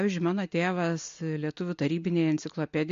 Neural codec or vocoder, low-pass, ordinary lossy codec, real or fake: none; 7.2 kHz; MP3, 48 kbps; real